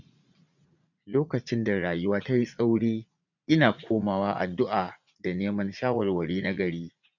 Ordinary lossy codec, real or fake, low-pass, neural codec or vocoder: none; fake; 7.2 kHz; vocoder, 44.1 kHz, 80 mel bands, Vocos